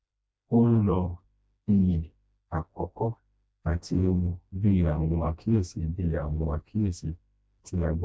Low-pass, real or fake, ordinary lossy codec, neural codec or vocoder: none; fake; none; codec, 16 kHz, 1 kbps, FreqCodec, smaller model